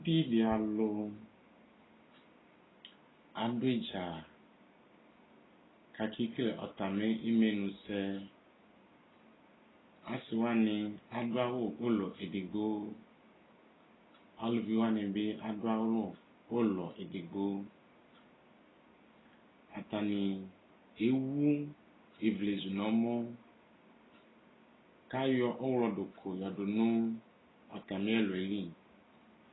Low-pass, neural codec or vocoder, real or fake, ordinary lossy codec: 7.2 kHz; none; real; AAC, 16 kbps